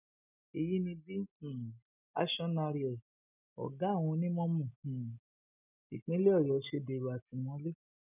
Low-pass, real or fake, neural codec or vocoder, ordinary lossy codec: 3.6 kHz; real; none; none